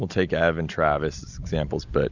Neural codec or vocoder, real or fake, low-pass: none; real; 7.2 kHz